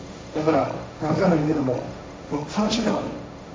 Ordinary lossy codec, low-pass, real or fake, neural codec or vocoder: none; none; fake; codec, 16 kHz, 1.1 kbps, Voila-Tokenizer